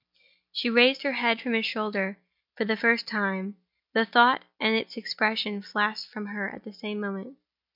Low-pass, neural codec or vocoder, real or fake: 5.4 kHz; none; real